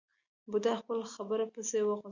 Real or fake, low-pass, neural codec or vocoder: real; 7.2 kHz; none